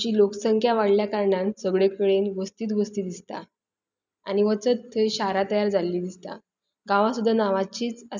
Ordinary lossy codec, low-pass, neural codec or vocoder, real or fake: none; 7.2 kHz; none; real